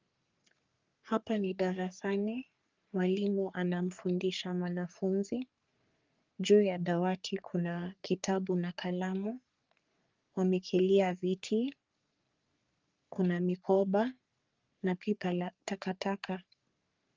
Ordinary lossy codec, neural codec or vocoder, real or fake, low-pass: Opus, 32 kbps; codec, 44.1 kHz, 3.4 kbps, Pupu-Codec; fake; 7.2 kHz